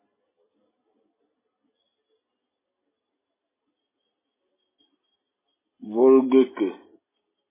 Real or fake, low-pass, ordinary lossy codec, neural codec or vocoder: real; 3.6 kHz; MP3, 16 kbps; none